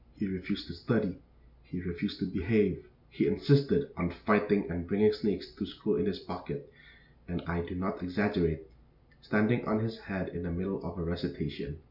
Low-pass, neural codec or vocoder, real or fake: 5.4 kHz; none; real